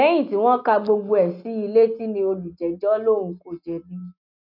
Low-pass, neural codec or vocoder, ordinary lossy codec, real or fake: 5.4 kHz; none; none; real